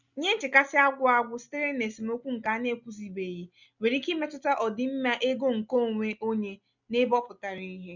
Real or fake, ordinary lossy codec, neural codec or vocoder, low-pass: real; none; none; 7.2 kHz